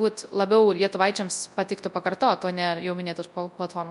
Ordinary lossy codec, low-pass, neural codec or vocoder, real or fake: MP3, 64 kbps; 10.8 kHz; codec, 24 kHz, 0.9 kbps, WavTokenizer, large speech release; fake